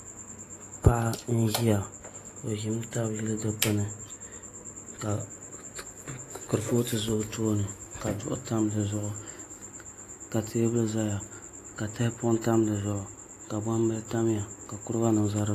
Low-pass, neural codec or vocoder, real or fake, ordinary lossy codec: 14.4 kHz; none; real; AAC, 48 kbps